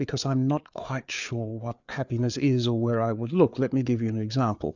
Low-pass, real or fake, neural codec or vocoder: 7.2 kHz; fake; codec, 16 kHz, 4 kbps, FreqCodec, larger model